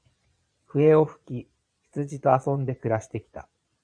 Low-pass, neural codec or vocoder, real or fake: 9.9 kHz; vocoder, 22.05 kHz, 80 mel bands, Vocos; fake